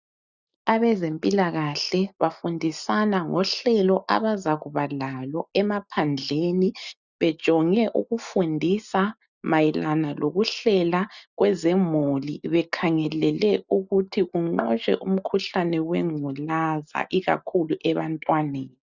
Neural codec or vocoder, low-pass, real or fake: none; 7.2 kHz; real